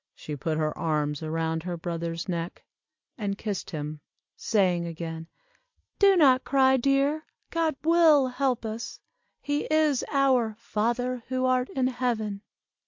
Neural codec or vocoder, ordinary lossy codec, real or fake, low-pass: none; MP3, 48 kbps; real; 7.2 kHz